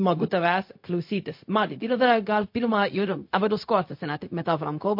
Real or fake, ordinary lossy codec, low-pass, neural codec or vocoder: fake; MP3, 32 kbps; 5.4 kHz; codec, 16 kHz, 0.4 kbps, LongCat-Audio-Codec